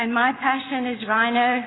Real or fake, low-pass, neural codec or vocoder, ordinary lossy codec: real; 7.2 kHz; none; AAC, 16 kbps